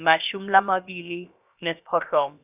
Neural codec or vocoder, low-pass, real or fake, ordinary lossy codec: codec, 16 kHz, about 1 kbps, DyCAST, with the encoder's durations; 3.6 kHz; fake; none